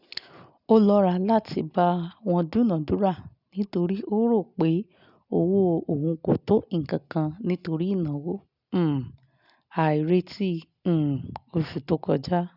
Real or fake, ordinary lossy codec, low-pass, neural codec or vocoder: real; none; 5.4 kHz; none